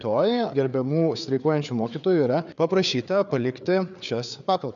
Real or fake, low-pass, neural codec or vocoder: fake; 7.2 kHz; codec, 16 kHz, 4 kbps, FreqCodec, larger model